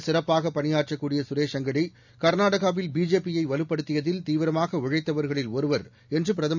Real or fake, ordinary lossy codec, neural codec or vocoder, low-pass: real; none; none; 7.2 kHz